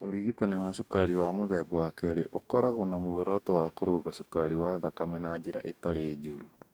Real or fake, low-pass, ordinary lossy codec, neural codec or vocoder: fake; none; none; codec, 44.1 kHz, 2.6 kbps, DAC